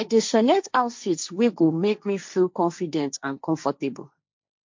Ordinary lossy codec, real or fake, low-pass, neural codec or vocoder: MP3, 48 kbps; fake; 7.2 kHz; codec, 16 kHz, 1.1 kbps, Voila-Tokenizer